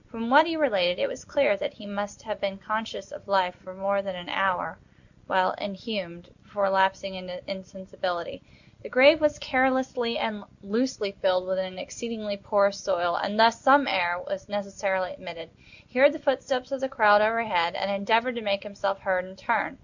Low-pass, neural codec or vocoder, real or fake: 7.2 kHz; none; real